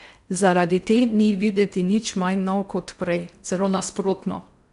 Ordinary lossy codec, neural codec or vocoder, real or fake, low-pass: none; codec, 16 kHz in and 24 kHz out, 0.6 kbps, FocalCodec, streaming, 4096 codes; fake; 10.8 kHz